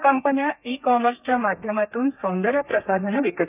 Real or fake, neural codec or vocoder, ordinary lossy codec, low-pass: fake; codec, 32 kHz, 1.9 kbps, SNAC; none; 3.6 kHz